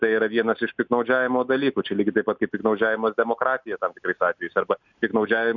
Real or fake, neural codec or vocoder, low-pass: real; none; 7.2 kHz